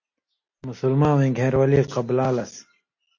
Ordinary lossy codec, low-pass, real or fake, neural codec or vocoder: AAC, 48 kbps; 7.2 kHz; real; none